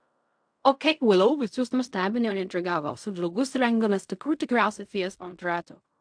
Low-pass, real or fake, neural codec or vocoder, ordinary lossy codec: 9.9 kHz; fake; codec, 16 kHz in and 24 kHz out, 0.4 kbps, LongCat-Audio-Codec, fine tuned four codebook decoder; AAC, 64 kbps